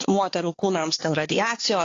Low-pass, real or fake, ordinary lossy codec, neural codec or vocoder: 7.2 kHz; fake; AAC, 32 kbps; codec, 16 kHz, 4 kbps, X-Codec, HuBERT features, trained on balanced general audio